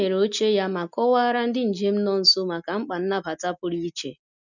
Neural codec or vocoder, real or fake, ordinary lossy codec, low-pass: none; real; none; 7.2 kHz